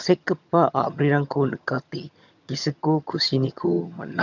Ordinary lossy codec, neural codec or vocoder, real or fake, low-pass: none; vocoder, 22.05 kHz, 80 mel bands, HiFi-GAN; fake; 7.2 kHz